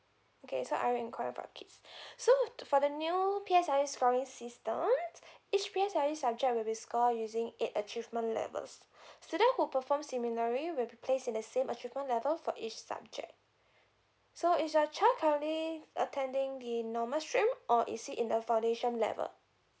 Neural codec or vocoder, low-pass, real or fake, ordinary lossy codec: none; none; real; none